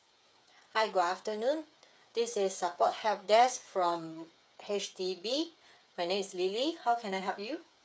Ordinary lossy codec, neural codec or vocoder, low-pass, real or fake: none; codec, 16 kHz, 8 kbps, FreqCodec, smaller model; none; fake